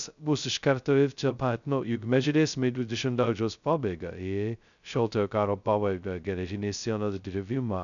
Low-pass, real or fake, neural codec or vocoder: 7.2 kHz; fake; codec, 16 kHz, 0.2 kbps, FocalCodec